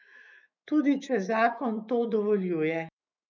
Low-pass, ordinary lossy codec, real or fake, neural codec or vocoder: 7.2 kHz; none; fake; autoencoder, 48 kHz, 128 numbers a frame, DAC-VAE, trained on Japanese speech